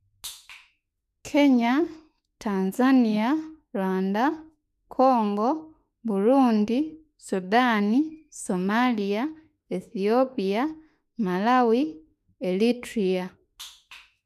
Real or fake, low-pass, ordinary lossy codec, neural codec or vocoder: fake; 14.4 kHz; none; autoencoder, 48 kHz, 32 numbers a frame, DAC-VAE, trained on Japanese speech